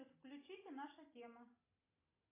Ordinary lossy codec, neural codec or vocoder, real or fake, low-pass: AAC, 16 kbps; none; real; 3.6 kHz